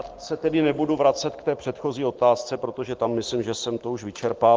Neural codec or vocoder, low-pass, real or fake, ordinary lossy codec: none; 7.2 kHz; real; Opus, 16 kbps